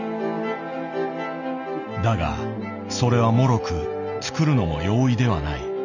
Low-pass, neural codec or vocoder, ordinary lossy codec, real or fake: 7.2 kHz; none; none; real